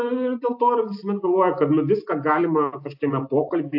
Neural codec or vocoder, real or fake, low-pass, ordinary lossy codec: none; real; 5.4 kHz; AAC, 48 kbps